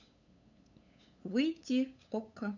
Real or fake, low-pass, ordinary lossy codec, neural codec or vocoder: fake; 7.2 kHz; none; codec, 16 kHz, 8 kbps, FunCodec, trained on LibriTTS, 25 frames a second